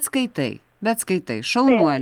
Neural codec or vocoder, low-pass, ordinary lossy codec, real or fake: none; 19.8 kHz; Opus, 32 kbps; real